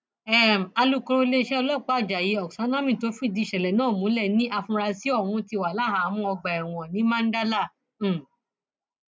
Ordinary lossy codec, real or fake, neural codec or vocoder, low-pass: none; real; none; none